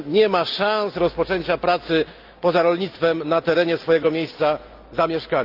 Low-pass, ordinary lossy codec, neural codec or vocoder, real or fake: 5.4 kHz; Opus, 32 kbps; none; real